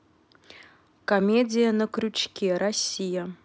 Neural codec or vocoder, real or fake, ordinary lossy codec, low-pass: none; real; none; none